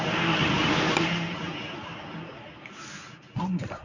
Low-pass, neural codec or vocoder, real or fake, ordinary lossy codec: 7.2 kHz; codec, 24 kHz, 0.9 kbps, WavTokenizer, medium speech release version 1; fake; none